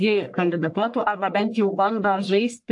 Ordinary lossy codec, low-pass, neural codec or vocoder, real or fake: AAC, 64 kbps; 10.8 kHz; codec, 44.1 kHz, 1.7 kbps, Pupu-Codec; fake